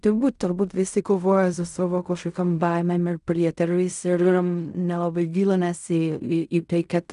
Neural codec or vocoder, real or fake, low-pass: codec, 16 kHz in and 24 kHz out, 0.4 kbps, LongCat-Audio-Codec, fine tuned four codebook decoder; fake; 10.8 kHz